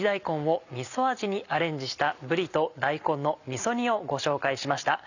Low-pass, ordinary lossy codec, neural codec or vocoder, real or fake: 7.2 kHz; none; none; real